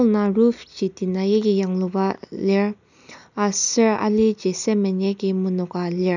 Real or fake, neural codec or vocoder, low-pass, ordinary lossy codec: real; none; 7.2 kHz; none